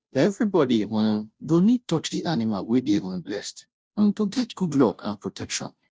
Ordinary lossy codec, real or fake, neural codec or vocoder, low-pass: none; fake; codec, 16 kHz, 0.5 kbps, FunCodec, trained on Chinese and English, 25 frames a second; none